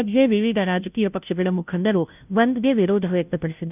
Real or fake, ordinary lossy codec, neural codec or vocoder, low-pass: fake; none; codec, 16 kHz, 0.5 kbps, FunCodec, trained on Chinese and English, 25 frames a second; 3.6 kHz